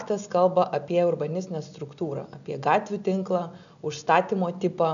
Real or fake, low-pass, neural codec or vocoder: real; 7.2 kHz; none